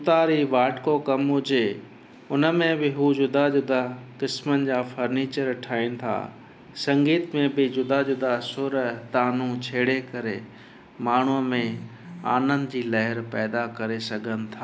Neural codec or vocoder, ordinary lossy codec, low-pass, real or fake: none; none; none; real